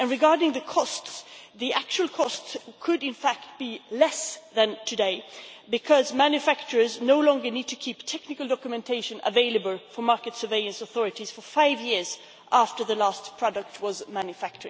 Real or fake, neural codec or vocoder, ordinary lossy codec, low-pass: real; none; none; none